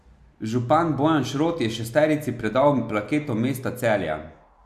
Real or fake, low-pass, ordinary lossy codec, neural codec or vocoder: real; 14.4 kHz; none; none